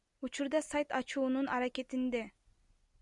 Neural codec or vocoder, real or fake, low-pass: none; real; 10.8 kHz